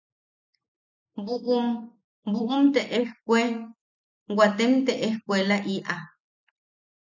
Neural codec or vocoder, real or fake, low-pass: none; real; 7.2 kHz